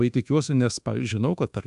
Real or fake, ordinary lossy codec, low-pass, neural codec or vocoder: fake; AAC, 96 kbps; 10.8 kHz; codec, 24 kHz, 1.2 kbps, DualCodec